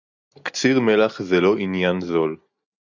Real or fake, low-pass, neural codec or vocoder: real; 7.2 kHz; none